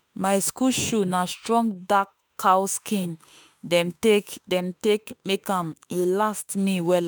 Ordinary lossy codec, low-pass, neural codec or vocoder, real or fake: none; none; autoencoder, 48 kHz, 32 numbers a frame, DAC-VAE, trained on Japanese speech; fake